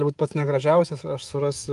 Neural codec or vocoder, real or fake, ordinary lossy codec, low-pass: codec, 24 kHz, 3.1 kbps, DualCodec; fake; Opus, 32 kbps; 10.8 kHz